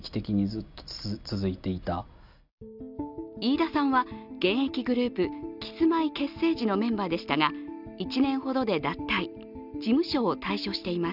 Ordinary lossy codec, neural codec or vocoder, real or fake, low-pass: none; none; real; 5.4 kHz